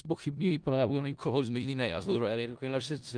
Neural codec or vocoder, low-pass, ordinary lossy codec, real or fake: codec, 16 kHz in and 24 kHz out, 0.4 kbps, LongCat-Audio-Codec, four codebook decoder; 9.9 kHz; Opus, 32 kbps; fake